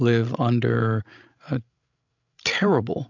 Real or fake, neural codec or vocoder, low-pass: fake; codec, 16 kHz, 16 kbps, FreqCodec, larger model; 7.2 kHz